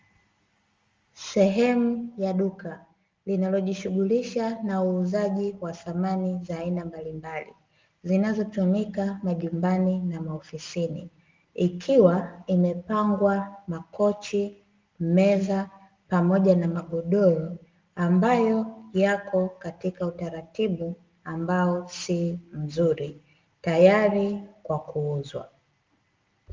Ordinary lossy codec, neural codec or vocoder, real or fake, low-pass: Opus, 32 kbps; none; real; 7.2 kHz